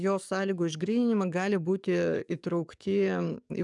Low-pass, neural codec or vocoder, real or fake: 10.8 kHz; codec, 44.1 kHz, 7.8 kbps, DAC; fake